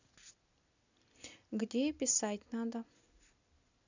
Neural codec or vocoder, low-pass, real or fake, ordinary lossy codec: none; 7.2 kHz; real; none